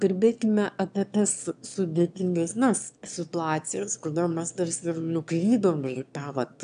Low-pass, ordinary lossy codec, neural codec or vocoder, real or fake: 9.9 kHz; AAC, 96 kbps; autoencoder, 22.05 kHz, a latent of 192 numbers a frame, VITS, trained on one speaker; fake